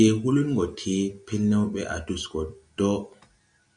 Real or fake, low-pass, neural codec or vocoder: real; 9.9 kHz; none